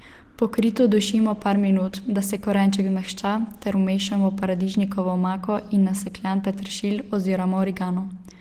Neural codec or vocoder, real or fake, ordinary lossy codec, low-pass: none; real; Opus, 16 kbps; 14.4 kHz